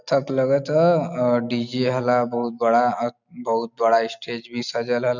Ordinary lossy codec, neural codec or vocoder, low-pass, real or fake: none; none; 7.2 kHz; real